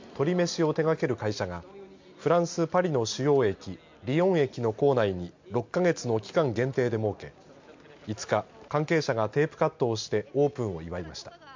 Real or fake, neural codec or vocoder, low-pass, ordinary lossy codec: real; none; 7.2 kHz; MP3, 48 kbps